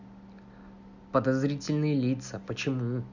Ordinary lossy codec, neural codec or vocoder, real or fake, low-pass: none; none; real; 7.2 kHz